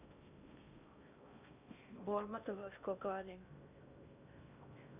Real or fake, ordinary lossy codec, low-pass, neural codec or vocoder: fake; none; 3.6 kHz; codec, 16 kHz in and 24 kHz out, 0.6 kbps, FocalCodec, streaming, 2048 codes